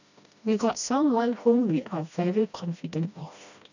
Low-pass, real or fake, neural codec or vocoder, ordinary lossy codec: 7.2 kHz; fake; codec, 16 kHz, 1 kbps, FreqCodec, smaller model; none